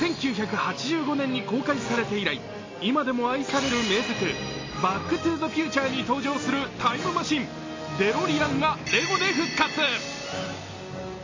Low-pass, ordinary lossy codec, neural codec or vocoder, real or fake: 7.2 kHz; MP3, 32 kbps; none; real